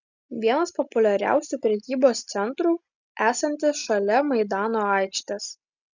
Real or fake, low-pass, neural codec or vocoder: real; 7.2 kHz; none